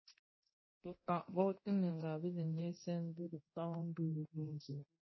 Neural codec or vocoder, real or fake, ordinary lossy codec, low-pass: codec, 24 kHz, 0.9 kbps, DualCodec; fake; MP3, 24 kbps; 7.2 kHz